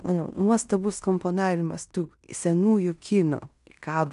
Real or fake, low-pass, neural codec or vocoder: fake; 10.8 kHz; codec, 16 kHz in and 24 kHz out, 0.9 kbps, LongCat-Audio-Codec, fine tuned four codebook decoder